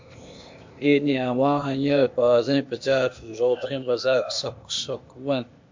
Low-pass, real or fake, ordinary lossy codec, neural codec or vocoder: 7.2 kHz; fake; MP3, 48 kbps; codec, 16 kHz, 0.8 kbps, ZipCodec